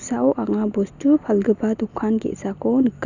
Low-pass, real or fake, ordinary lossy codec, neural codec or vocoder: 7.2 kHz; real; none; none